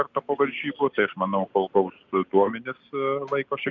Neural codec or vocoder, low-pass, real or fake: none; 7.2 kHz; real